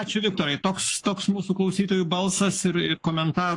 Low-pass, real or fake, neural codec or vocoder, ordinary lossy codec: 10.8 kHz; fake; codec, 44.1 kHz, 7.8 kbps, Pupu-Codec; AAC, 48 kbps